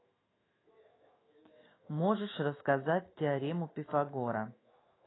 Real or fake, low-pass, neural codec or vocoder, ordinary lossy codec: fake; 7.2 kHz; autoencoder, 48 kHz, 128 numbers a frame, DAC-VAE, trained on Japanese speech; AAC, 16 kbps